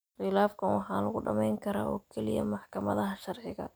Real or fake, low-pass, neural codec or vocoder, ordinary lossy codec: real; none; none; none